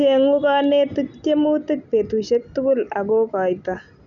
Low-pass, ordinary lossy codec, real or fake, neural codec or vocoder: 7.2 kHz; none; real; none